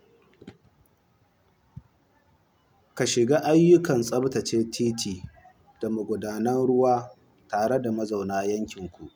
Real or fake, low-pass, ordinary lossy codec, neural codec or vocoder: real; none; none; none